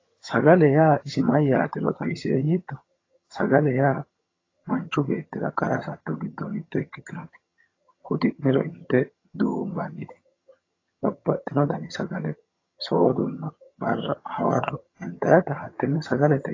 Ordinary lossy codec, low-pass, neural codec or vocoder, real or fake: AAC, 32 kbps; 7.2 kHz; vocoder, 22.05 kHz, 80 mel bands, HiFi-GAN; fake